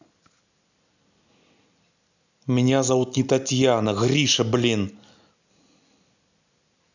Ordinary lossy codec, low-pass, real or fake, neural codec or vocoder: none; 7.2 kHz; real; none